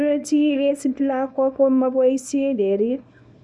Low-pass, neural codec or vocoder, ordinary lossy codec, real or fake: none; codec, 24 kHz, 0.9 kbps, WavTokenizer, small release; none; fake